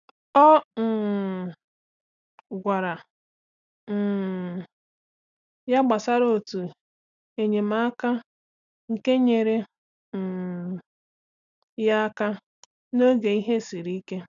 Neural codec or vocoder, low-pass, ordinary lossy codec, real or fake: none; 7.2 kHz; none; real